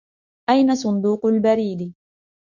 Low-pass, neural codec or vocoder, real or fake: 7.2 kHz; autoencoder, 48 kHz, 128 numbers a frame, DAC-VAE, trained on Japanese speech; fake